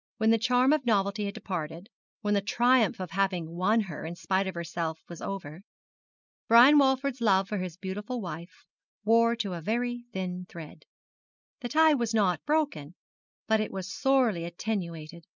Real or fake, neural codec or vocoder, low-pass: real; none; 7.2 kHz